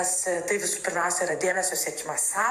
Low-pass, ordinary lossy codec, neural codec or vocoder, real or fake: 14.4 kHz; AAC, 96 kbps; vocoder, 44.1 kHz, 128 mel bands every 512 samples, BigVGAN v2; fake